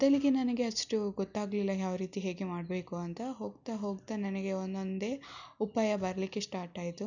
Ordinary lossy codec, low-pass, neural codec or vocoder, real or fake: none; 7.2 kHz; none; real